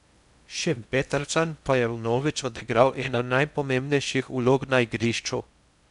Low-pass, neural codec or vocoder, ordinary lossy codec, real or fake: 10.8 kHz; codec, 16 kHz in and 24 kHz out, 0.6 kbps, FocalCodec, streaming, 2048 codes; none; fake